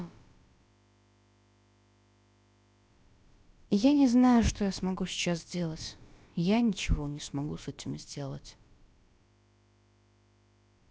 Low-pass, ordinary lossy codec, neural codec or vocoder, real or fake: none; none; codec, 16 kHz, about 1 kbps, DyCAST, with the encoder's durations; fake